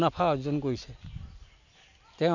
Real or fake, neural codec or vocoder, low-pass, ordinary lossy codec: real; none; 7.2 kHz; none